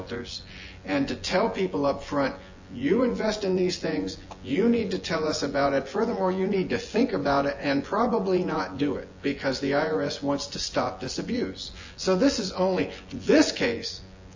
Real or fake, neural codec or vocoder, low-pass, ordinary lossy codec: fake; vocoder, 24 kHz, 100 mel bands, Vocos; 7.2 kHz; AAC, 48 kbps